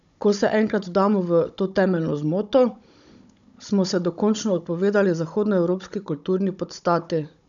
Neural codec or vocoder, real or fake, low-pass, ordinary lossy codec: codec, 16 kHz, 16 kbps, FunCodec, trained on Chinese and English, 50 frames a second; fake; 7.2 kHz; none